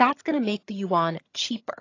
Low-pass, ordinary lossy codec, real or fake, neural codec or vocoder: 7.2 kHz; AAC, 32 kbps; fake; vocoder, 22.05 kHz, 80 mel bands, HiFi-GAN